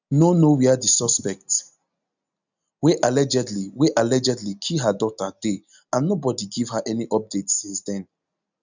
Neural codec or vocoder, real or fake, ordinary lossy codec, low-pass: none; real; none; 7.2 kHz